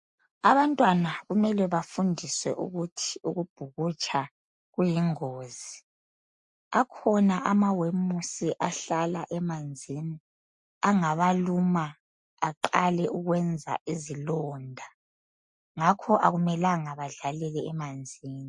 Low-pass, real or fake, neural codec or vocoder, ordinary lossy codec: 10.8 kHz; real; none; MP3, 48 kbps